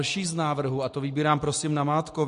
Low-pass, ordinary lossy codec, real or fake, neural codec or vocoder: 14.4 kHz; MP3, 48 kbps; real; none